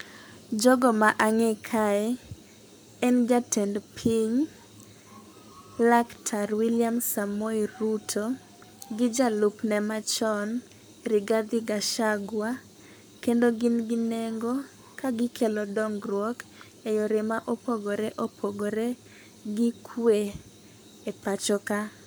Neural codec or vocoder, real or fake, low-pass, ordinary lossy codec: codec, 44.1 kHz, 7.8 kbps, Pupu-Codec; fake; none; none